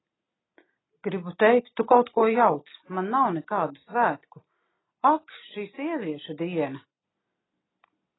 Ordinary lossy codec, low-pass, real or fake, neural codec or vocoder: AAC, 16 kbps; 7.2 kHz; real; none